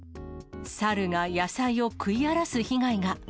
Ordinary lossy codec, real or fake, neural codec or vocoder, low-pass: none; real; none; none